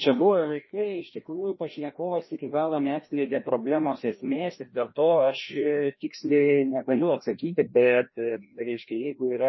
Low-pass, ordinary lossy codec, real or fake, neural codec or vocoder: 7.2 kHz; MP3, 24 kbps; fake; codec, 16 kHz, 1 kbps, FreqCodec, larger model